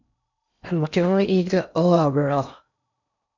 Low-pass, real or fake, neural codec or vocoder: 7.2 kHz; fake; codec, 16 kHz in and 24 kHz out, 0.8 kbps, FocalCodec, streaming, 65536 codes